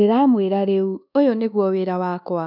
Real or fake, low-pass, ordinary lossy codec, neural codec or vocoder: fake; 5.4 kHz; none; codec, 24 kHz, 1.2 kbps, DualCodec